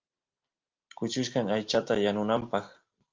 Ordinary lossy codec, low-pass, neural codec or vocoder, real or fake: Opus, 24 kbps; 7.2 kHz; none; real